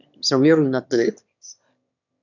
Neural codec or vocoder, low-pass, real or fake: autoencoder, 22.05 kHz, a latent of 192 numbers a frame, VITS, trained on one speaker; 7.2 kHz; fake